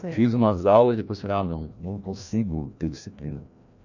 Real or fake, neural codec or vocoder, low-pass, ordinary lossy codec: fake; codec, 16 kHz, 1 kbps, FreqCodec, larger model; 7.2 kHz; none